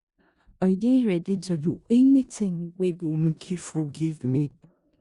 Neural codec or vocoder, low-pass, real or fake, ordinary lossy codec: codec, 16 kHz in and 24 kHz out, 0.4 kbps, LongCat-Audio-Codec, four codebook decoder; 10.8 kHz; fake; Opus, 64 kbps